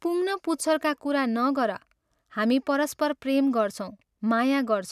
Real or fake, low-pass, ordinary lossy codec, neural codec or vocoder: real; 14.4 kHz; none; none